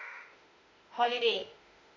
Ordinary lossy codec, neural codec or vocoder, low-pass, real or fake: MP3, 48 kbps; autoencoder, 48 kHz, 32 numbers a frame, DAC-VAE, trained on Japanese speech; 7.2 kHz; fake